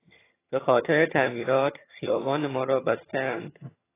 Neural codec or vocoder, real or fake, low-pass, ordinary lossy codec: vocoder, 22.05 kHz, 80 mel bands, HiFi-GAN; fake; 3.6 kHz; AAC, 16 kbps